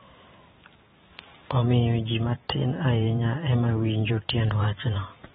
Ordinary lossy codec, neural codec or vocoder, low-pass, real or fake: AAC, 16 kbps; vocoder, 44.1 kHz, 128 mel bands every 512 samples, BigVGAN v2; 19.8 kHz; fake